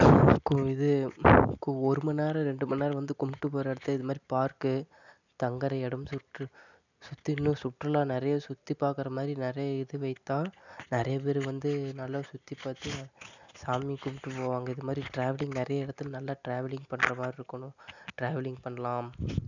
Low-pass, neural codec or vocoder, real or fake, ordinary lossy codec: 7.2 kHz; none; real; none